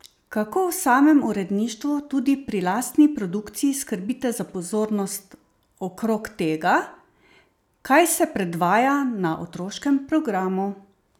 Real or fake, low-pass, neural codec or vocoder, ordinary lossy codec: real; 19.8 kHz; none; none